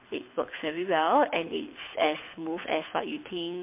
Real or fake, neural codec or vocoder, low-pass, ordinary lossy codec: fake; codec, 24 kHz, 6 kbps, HILCodec; 3.6 kHz; MP3, 24 kbps